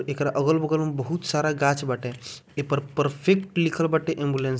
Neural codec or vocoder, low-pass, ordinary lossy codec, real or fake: none; none; none; real